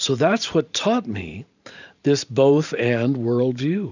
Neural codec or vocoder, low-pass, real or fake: vocoder, 44.1 kHz, 128 mel bands every 512 samples, BigVGAN v2; 7.2 kHz; fake